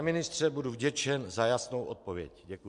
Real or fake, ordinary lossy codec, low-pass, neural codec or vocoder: fake; MP3, 64 kbps; 10.8 kHz; vocoder, 44.1 kHz, 128 mel bands every 256 samples, BigVGAN v2